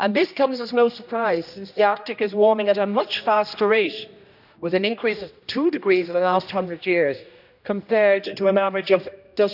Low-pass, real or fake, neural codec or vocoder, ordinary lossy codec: 5.4 kHz; fake; codec, 16 kHz, 1 kbps, X-Codec, HuBERT features, trained on general audio; none